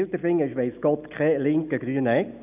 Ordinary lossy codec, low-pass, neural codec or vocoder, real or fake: none; 3.6 kHz; none; real